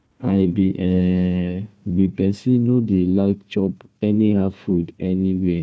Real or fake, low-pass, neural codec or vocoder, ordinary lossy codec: fake; none; codec, 16 kHz, 1 kbps, FunCodec, trained on Chinese and English, 50 frames a second; none